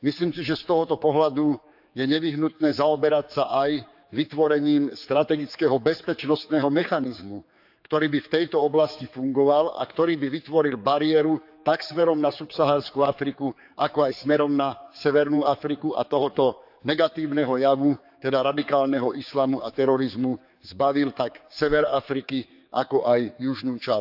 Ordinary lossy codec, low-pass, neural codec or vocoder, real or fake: AAC, 48 kbps; 5.4 kHz; codec, 16 kHz, 4 kbps, X-Codec, HuBERT features, trained on general audio; fake